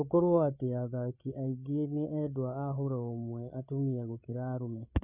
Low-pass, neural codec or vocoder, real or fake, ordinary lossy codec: 3.6 kHz; codec, 16 kHz, 8 kbps, FreqCodec, larger model; fake; none